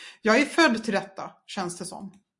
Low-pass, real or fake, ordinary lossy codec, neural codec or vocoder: 10.8 kHz; real; MP3, 64 kbps; none